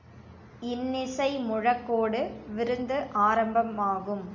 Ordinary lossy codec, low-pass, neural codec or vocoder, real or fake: MP3, 64 kbps; 7.2 kHz; none; real